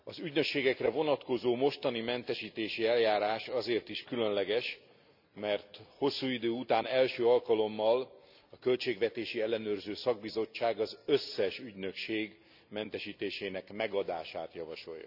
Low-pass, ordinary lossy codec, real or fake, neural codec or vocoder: 5.4 kHz; none; real; none